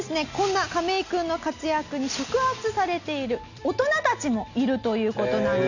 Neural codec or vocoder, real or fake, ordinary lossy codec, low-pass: none; real; none; 7.2 kHz